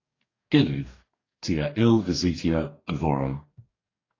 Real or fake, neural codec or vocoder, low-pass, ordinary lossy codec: fake; codec, 44.1 kHz, 2.6 kbps, DAC; 7.2 kHz; AAC, 32 kbps